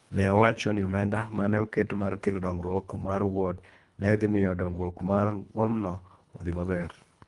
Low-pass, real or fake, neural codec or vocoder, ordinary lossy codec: 10.8 kHz; fake; codec, 24 kHz, 1.5 kbps, HILCodec; Opus, 32 kbps